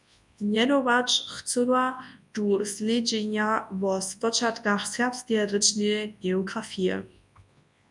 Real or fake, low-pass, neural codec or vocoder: fake; 10.8 kHz; codec, 24 kHz, 0.9 kbps, WavTokenizer, large speech release